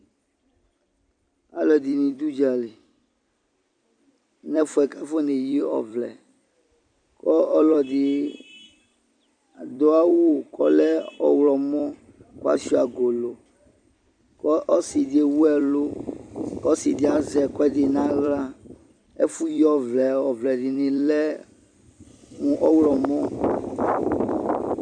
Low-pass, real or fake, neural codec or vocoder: 9.9 kHz; fake; vocoder, 44.1 kHz, 128 mel bands every 256 samples, BigVGAN v2